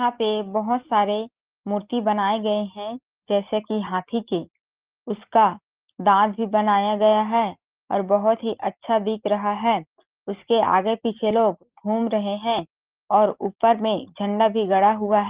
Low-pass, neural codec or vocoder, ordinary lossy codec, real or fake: 3.6 kHz; none; Opus, 16 kbps; real